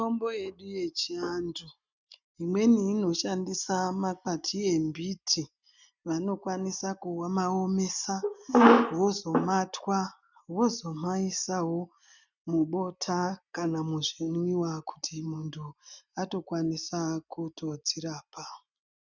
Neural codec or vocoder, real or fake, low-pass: none; real; 7.2 kHz